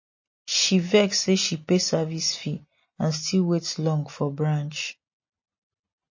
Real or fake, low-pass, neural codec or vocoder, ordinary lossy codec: real; 7.2 kHz; none; MP3, 32 kbps